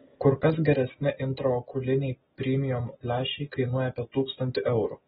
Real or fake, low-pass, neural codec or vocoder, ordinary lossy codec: real; 19.8 kHz; none; AAC, 16 kbps